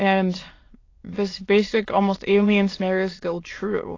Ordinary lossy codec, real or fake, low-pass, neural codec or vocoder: AAC, 32 kbps; fake; 7.2 kHz; autoencoder, 22.05 kHz, a latent of 192 numbers a frame, VITS, trained on many speakers